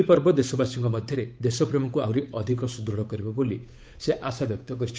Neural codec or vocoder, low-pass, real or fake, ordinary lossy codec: codec, 16 kHz, 8 kbps, FunCodec, trained on Chinese and English, 25 frames a second; none; fake; none